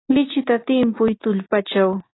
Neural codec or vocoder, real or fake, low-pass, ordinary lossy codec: none; real; 7.2 kHz; AAC, 16 kbps